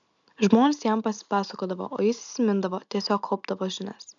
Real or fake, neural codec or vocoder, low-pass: real; none; 7.2 kHz